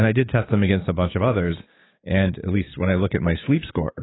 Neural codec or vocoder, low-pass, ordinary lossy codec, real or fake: codec, 16 kHz, 6 kbps, DAC; 7.2 kHz; AAC, 16 kbps; fake